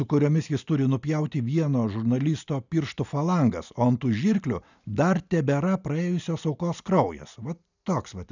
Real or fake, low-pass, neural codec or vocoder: real; 7.2 kHz; none